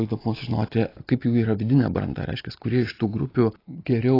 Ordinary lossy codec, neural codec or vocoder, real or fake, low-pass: AAC, 24 kbps; none; real; 5.4 kHz